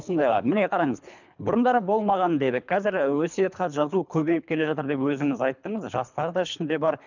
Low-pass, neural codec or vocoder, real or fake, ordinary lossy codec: 7.2 kHz; codec, 24 kHz, 3 kbps, HILCodec; fake; none